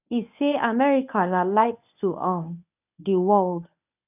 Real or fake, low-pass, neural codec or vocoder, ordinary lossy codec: fake; 3.6 kHz; codec, 24 kHz, 0.9 kbps, WavTokenizer, medium speech release version 1; none